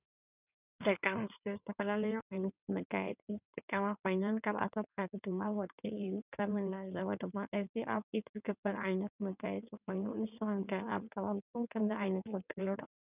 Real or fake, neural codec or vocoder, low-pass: fake; codec, 16 kHz in and 24 kHz out, 2.2 kbps, FireRedTTS-2 codec; 3.6 kHz